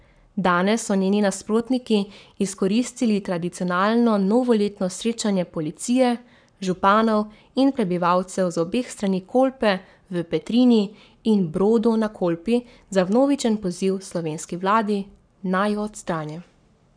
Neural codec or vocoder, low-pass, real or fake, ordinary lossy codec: codec, 44.1 kHz, 7.8 kbps, Pupu-Codec; 9.9 kHz; fake; none